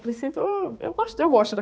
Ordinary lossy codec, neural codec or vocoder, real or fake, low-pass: none; codec, 16 kHz, 2 kbps, X-Codec, HuBERT features, trained on balanced general audio; fake; none